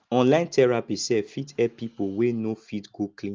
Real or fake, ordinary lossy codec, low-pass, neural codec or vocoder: real; Opus, 32 kbps; 7.2 kHz; none